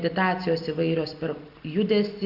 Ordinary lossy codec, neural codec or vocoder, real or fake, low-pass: Opus, 64 kbps; none; real; 5.4 kHz